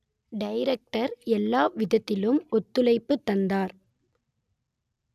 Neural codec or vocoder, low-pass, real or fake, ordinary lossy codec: none; 14.4 kHz; real; none